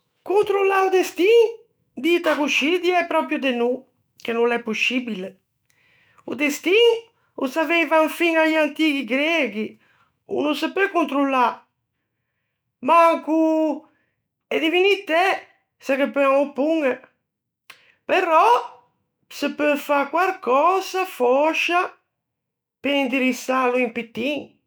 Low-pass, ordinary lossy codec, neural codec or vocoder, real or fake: none; none; autoencoder, 48 kHz, 128 numbers a frame, DAC-VAE, trained on Japanese speech; fake